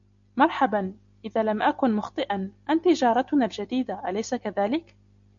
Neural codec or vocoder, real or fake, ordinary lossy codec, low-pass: none; real; MP3, 96 kbps; 7.2 kHz